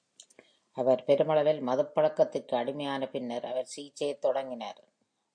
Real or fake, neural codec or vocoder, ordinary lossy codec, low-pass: real; none; AAC, 64 kbps; 9.9 kHz